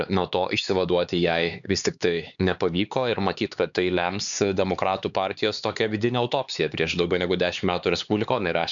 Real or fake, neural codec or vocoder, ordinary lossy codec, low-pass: fake; codec, 16 kHz, 4 kbps, X-Codec, HuBERT features, trained on LibriSpeech; MP3, 96 kbps; 7.2 kHz